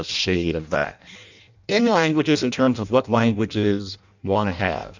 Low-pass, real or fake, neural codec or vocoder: 7.2 kHz; fake; codec, 16 kHz in and 24 kHz out, 0.6 kbps, FireRedTTS-2 codec